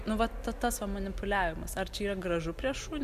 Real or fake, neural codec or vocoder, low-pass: real; none; 14.4 kHz